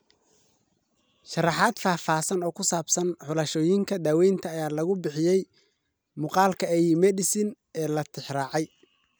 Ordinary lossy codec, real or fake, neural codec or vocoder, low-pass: none; real; none; none